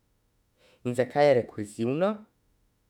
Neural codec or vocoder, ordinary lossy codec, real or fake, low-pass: autoencoder, 48 kHz, 32 numbers a frame, DAC-VAE, trained on Japanese speech; none; fake; 19.8 kHz